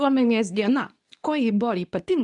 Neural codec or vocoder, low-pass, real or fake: codec, 24 kHz, 0.9 kbps, WavTokenizer, medium speech release version 2; 10.8 kHz; fake